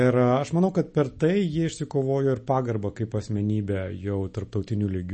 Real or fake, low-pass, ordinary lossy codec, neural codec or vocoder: real; 10.8 kHz; MP3, 32 kbps; none